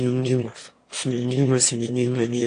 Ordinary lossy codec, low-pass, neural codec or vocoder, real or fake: AAC, 48 kbps; 9.9 kHz; autoencoder, 22.05 kHz, a latent of 192 numbers a frame, VITS, trained on one speaker; fake